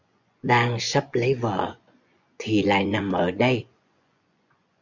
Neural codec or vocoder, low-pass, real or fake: vocoder, 24 kHz, 100 mel bands, Vocos; 7.2 kHz; fake